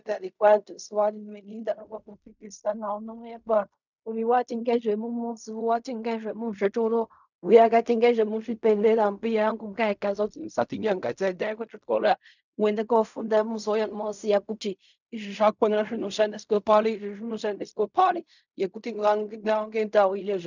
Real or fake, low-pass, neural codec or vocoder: fake; 7.2 kHz; codec, 16 kHz in and 24 kHz out, 0.4 kbps, LongCat-Audio-Codec, fine tuned four codebook decoder